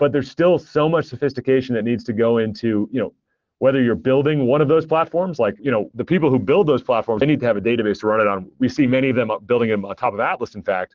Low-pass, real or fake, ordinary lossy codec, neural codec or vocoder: 7.2 kHz; real; Opus, 16 kbps; none